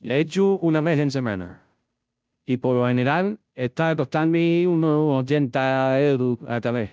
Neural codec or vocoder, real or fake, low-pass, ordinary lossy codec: codec, 16 kHz, 0.5 kbps, FunCodec, trained on Chinese and English, 25 frames a second; fake; none; none